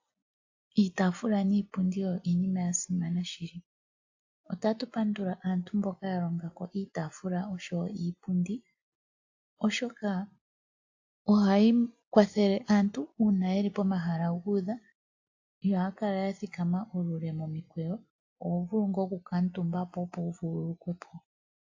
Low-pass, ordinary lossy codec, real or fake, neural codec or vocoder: 7.2 kHz; AAC, 48 kbps; real; none